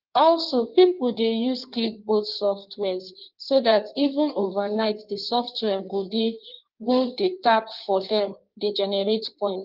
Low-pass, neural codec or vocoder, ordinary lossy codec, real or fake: 5.4 kHz; codec, 16 kHz in and 24 kHz out, 1.1 kbps, FireRedTTS-2 codec; Opus, 32 kbps; fake